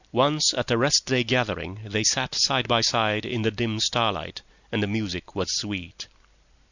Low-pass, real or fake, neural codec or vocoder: 7.2 kHz; fake; vocoder, 44.1 kHz, 128 mel bands every 256 samples, BigVGAN v2